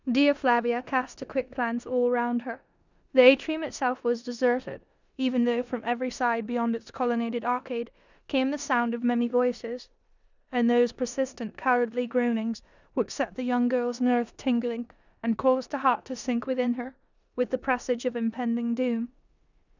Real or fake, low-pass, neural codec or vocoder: fake; 7.2 kHz; codec, 16 kHz in and 24 kHz out, 0.9 kbps, LongCat-Audio-Codec, four codebook decoder